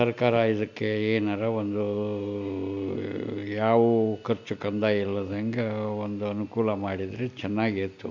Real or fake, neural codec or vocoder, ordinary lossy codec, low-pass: real; none; MP3, 64 kbps; 7.2 kHz